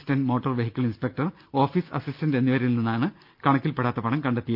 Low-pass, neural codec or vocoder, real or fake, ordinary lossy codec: 5.4 kHz; none; real; Opus, 24 kbps